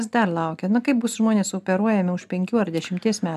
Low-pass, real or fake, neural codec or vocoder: 14.4 kHz; real; none